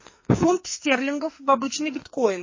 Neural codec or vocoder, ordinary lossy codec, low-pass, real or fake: codec, 44.1 kHz, 2.6 kbps, SNAC; MP3, 32 kbps; 7.2 kHz; fake